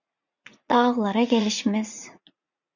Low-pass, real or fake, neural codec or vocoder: 7.2 kHz; fake; vocoder, 44.1 kHz, 80 mel bands, Vocos